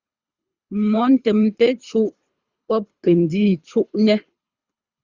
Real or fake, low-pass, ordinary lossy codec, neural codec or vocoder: fake; 7.2 kHz; Opus, 64 kbps; codec, 24 kHz, 3 kbps, HILCodec